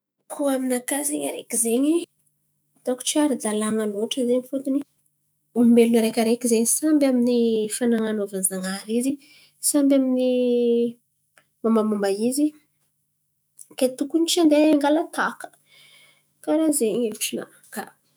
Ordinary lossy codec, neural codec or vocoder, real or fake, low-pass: none; autoencoder, 48 kHz, 128 numbers a frame, DAC-VAE, trained on Japanese speech; fake; none